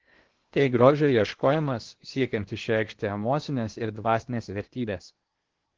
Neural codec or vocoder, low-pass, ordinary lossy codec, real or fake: codec, 16 kHz in and 24 kHz out, 0.8 kbps, FocalCodec, streaming, 65536 codes; 7.2 kHz; Opus, 16 kbps; fake